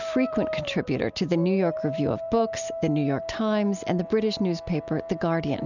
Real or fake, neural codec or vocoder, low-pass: real; none; 7.2 kHz